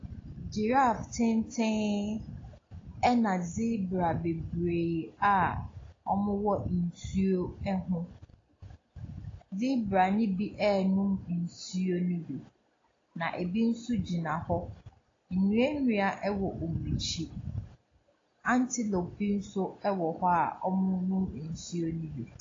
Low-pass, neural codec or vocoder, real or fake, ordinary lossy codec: 7.2 kHz; none; real; AAC, 32 kbps